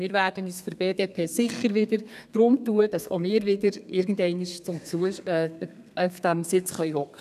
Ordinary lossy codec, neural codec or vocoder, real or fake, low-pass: none; codec, 32 kHz, 1.9 kbps, SNAC; fake; 14.4 kHz